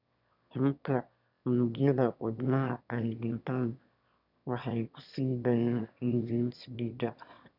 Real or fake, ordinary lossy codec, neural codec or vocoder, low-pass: fake; none; autoencoder, 22.05 kHz, a latent of 192 numbers a frame, VITS, trained on one speaker; 5.4 kHz